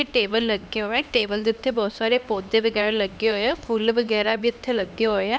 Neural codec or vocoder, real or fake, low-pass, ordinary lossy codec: codec, 16 kHz, 2 kbps, X-Codec, HuBERT features, trained on LibriSpeech; fake; none; none